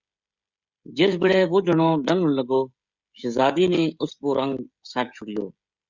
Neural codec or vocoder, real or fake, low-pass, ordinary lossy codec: codec, 16 kHz, 16 kbps, FreqCodec, smaller model; fake; 7.2 kHz; Opus, 64 kbps